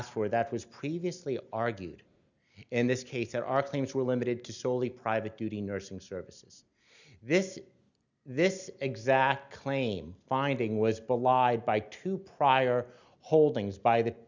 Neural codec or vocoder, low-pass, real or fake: none; 7.2 kHz; real